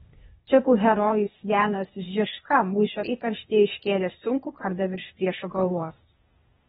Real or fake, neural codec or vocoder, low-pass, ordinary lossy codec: fake; codec, 16 kHz, 0.8 kbps, ZipCodec; 7.2 kHz; AAC, 16 kbps